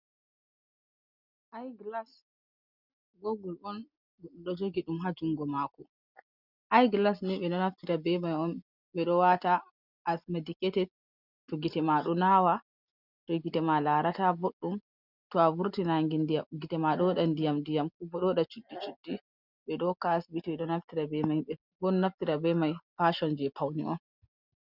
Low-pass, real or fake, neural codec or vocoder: 5.4 kHz; real; none